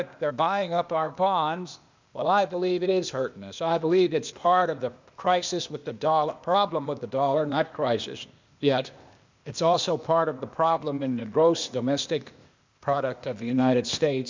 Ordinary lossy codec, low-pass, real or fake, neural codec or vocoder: MP3, 64 kbps; 7.2 kHz; fake; codec, 16 kHz, 0.8 kbps, ZipCodec